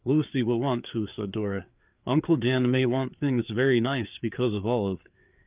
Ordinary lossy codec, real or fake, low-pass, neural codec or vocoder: Opus, 32 kbps; fake; 3.6 kHz; codec, 16 kHz, 4 kbps, FreqCodec, larger model